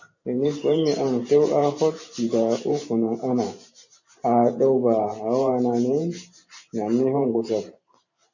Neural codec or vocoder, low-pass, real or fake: vocoder, 44.1 kHz, 128 mel bands every 512 samples, BigVGAN v2; 7.2 kHz; fake